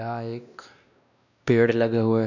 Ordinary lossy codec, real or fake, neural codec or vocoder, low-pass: none; fake; codec, 16 kHz, 1 kbps, X-Codec, WavLM features, trained on Multilingual LibriSpeech; 7.2 kHz